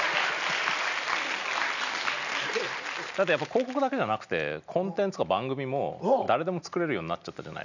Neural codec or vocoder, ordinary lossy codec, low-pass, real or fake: none; none; 7.2 kHz; real